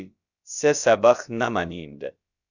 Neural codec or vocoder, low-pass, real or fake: codec, 16 kHz, about 1 kbps, DyCAST, with the encoder's durations; 7.2 kHz; fake